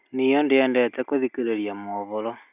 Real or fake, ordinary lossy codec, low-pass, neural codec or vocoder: real; none; 3.6 kHz; none